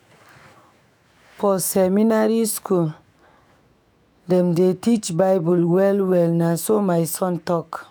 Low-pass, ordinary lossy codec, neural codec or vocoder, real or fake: none; none; autoencoder, 48 kHz, 128 numbers a frame, DAC-VAE, trained on Japanese speech; fake